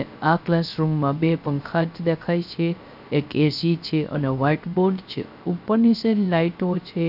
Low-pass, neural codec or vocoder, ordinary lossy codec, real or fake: 5.4 kHz; codec, 16 kHz, 0.3 kbps, FocalCodec; none; fake